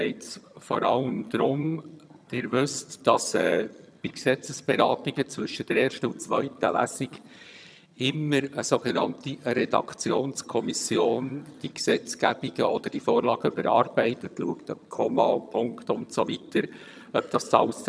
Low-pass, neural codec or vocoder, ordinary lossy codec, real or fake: none; vocoder, 22.05 kHz, 80 mel bands, HiFi-GAN; none; fake